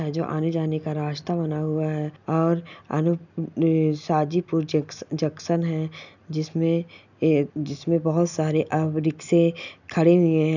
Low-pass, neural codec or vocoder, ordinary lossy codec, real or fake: 7.2 kHz; none; none; real